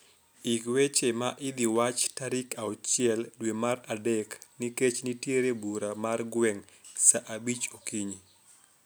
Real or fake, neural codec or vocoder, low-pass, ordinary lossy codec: real; none; none; none